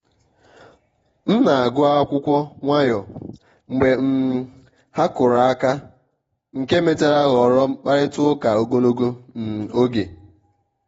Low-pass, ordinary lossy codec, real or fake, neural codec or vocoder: 19.8 kHz; AAC, 24 kbps; fake; vocoder, 48 kHz, 128 mel bands, Vocos